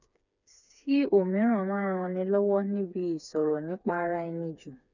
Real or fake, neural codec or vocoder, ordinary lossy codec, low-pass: fake; codec, 16 kHz, 4 kbps, FreqCodec, smaller model; none; 7.2 kHz